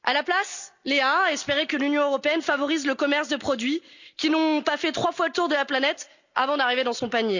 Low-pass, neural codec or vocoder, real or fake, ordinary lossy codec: 7.2 kHz; none; real; none